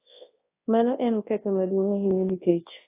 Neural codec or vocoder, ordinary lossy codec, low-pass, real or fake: codec, 24 kHz, 0.9 kbps, WavTokenizer, large speech release; AAC, 16 kbps; 3.6 kHz; fake